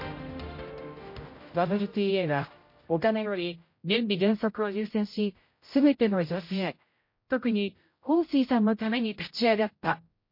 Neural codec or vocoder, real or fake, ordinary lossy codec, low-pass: codec, 16 kHz, 0.5 kbps, X-Codec, HuBERT features, trained on general audio; fake; MP3, 32 kbps; 5.4 kHz